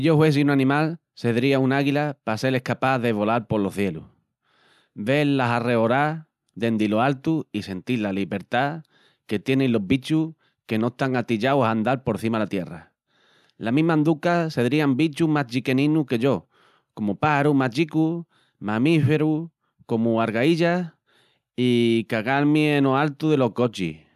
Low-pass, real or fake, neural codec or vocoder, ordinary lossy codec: 14.4 kHz; real; none; none